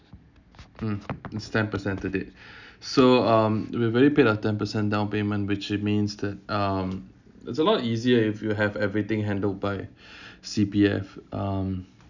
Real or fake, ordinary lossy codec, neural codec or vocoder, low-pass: real; none; none; 7.2 kHz